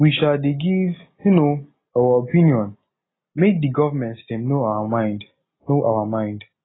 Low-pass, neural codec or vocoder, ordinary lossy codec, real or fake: 7.2 kHz; none; AAC, 16 kbps; real